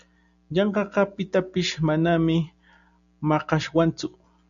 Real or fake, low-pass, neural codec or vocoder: real; 7.2 kHz; none